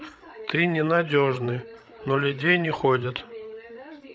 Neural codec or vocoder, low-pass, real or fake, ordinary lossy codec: codec, 16 kHz, 8 kbps, FreqCodec, larger model; none; fake; none